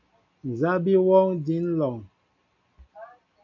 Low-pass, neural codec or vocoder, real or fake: 7.2 kHz; none; real